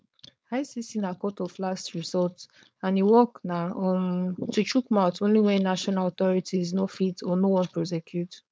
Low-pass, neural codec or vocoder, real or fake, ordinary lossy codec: none; codec, 16 kHz, 4.8 kbps, FACodec; fake; none